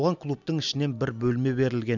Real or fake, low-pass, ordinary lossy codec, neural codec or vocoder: real; 7.2 kHz; none; none